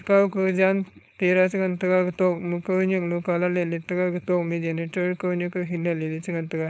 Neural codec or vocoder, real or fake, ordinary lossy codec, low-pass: codec, 16 kHz, 4.8 kbps, FACodec; fake; none; none